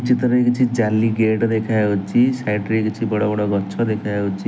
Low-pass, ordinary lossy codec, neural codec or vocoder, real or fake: none; none; none; real